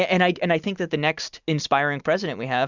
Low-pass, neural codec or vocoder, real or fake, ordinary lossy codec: 7.2 kHz; none; real; Opus, 64 kbps